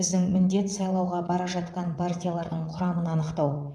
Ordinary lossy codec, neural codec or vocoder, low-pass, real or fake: none; vocoder, 22.05 kHz, 80 mel bands, WaveNeXt; none; fake